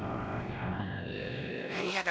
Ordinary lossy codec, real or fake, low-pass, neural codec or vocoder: none; fake; none; codec, 16 kHz, 1 kbps, X-Codec, WavLM features, trained on Multilingual LibriSpeech